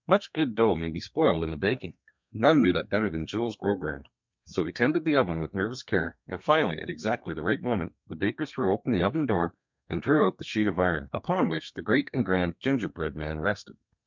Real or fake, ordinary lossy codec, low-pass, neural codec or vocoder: fake; MP3, 64 kbps; 7.2 kHz; codec, 32 kHz, 1.9 kbps, SNAC